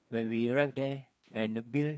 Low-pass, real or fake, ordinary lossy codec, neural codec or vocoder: none; fake; none; codec, 16 kHz, 2 kbps, FreqCodec, larger model